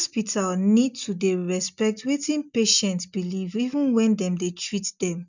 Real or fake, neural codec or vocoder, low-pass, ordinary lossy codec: real; none; 7.2 kHz; none